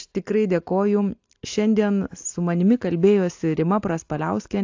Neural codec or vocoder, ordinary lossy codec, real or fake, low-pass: none; AAC, 48 kbps; real; 7.2 kHz